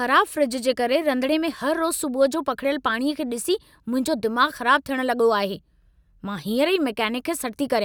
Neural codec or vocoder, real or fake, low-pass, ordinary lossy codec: none; real; none; none